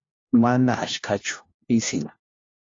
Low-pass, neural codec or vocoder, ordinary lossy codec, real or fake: 7.2 kHz; codec, 16 kHz, 1 kbps, FunCodec, trained on LibriTTS, 50 frames a second; AAC, 32 kbps; fake